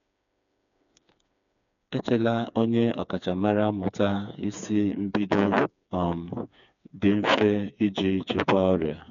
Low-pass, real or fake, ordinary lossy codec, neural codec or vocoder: 7.2 kHz; fake; none; codec, 16 kHz, 4 kbps, FreqCodec, smaller model